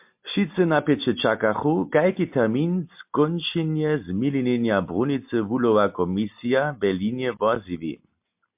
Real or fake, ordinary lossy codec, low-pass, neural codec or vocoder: real; MP3, 32 kbps; 3.6 kHz; none